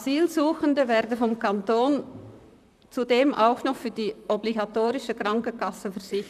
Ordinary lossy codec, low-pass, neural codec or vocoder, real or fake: none; 14.4 kHz; vocoder, 44.1 kHz, 128 mel bands, Pupu-Vocoder; fake